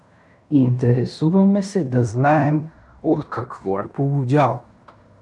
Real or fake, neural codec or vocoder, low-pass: fake; codec, 16 kHz in and 24 kHz out, 0.9 kbps, LongCat-Audio-Codec, fine tuned four codebook decoder; 10.8 kHz